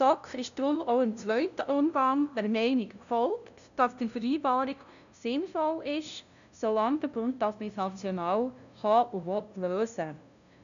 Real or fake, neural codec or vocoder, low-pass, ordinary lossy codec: fake; codec, 16 kHz, 0.5 kbps, FunCodec, trained on LibriTTS, 25 frames a second; 7.2 kHz; none